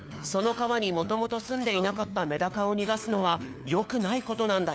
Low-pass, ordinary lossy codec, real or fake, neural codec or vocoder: none; none; fake; codec, 16 kHz, 4 kbps, FunCodec, trained on LibriTTS, 50 frames a second